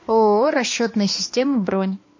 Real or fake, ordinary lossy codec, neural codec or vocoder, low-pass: fake; MP3, 32 kbps; codec, 16 kHz, 2 kbps, X-Codec, HuBERT features, trained on balanced general audio; 7.2 kHz